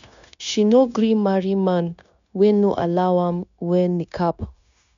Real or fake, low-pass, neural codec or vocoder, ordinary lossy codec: fake; 7.2 kHz; codec, 16 kHz, 0.9 kbps, LongCat-Audio-Codec; MP3, 96 kbps